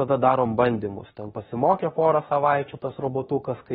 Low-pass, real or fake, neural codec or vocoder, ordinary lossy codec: 19.8 kHz; fake; autoencoder, 48 kHz, 32 numbers a frame, DAC-VAE, trained on Japanese speech; AAC, 16 kbps